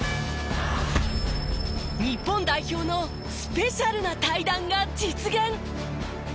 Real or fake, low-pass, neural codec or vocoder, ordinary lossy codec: real; none; none; none